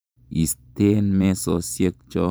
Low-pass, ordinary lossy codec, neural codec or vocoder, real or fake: none; none; vocoder, 44.1 kHz, 128 mel bands every 512 samples, BigVGAN v2; fake